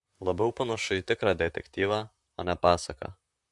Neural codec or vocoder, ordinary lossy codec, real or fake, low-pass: vocoder, 44.1 kHz, 128 mel bands, Pupu-Vocoder; MP3, 64 kbps; fake; 10.8 kHz